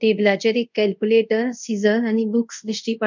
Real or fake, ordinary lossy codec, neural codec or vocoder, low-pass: fake; none; codec, 24 kHz, 0.5 kbps, DualCodec; 7.2 kHz